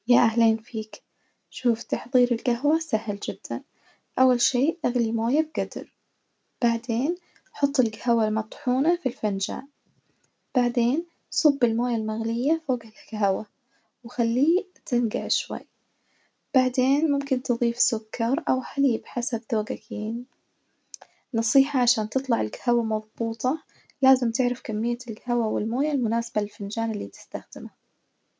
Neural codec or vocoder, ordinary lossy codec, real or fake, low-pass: none; none; real; none